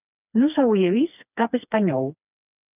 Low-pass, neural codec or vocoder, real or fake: 3.6 kHz; codec, 16 kHz, 2 kbps, FreqCodec, smaller model; fake